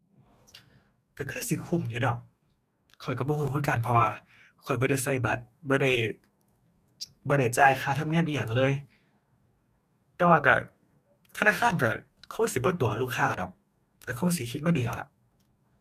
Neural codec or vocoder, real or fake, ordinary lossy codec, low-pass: codec, 44.1 kHz, 2.6 kbps, DAC; fake; none; 14.4 kHz